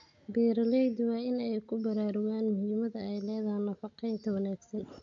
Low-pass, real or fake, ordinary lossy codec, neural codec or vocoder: 7.2 kHz; real; MP3, 48 kbps; none